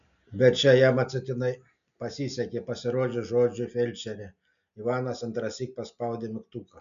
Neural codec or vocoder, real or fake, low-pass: none; real; 7.2 kHz